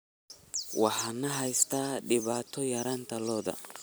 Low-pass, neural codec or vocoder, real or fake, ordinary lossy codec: none; vocoder, 44.1 kHz, 128 mel bands every 256 samples, BigVGAN v2; fake; none